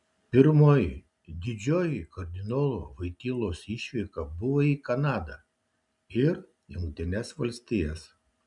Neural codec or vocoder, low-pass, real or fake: none; 10.8 kHz; real